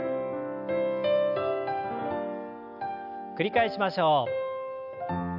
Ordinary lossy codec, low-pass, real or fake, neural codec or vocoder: none; 5.4 kHz; real; none